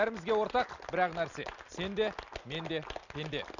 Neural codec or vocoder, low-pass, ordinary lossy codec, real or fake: none; 7.2 kHz; Opus, 64 kbps; real